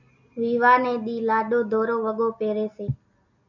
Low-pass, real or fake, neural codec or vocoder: 7.2 kHz; real; none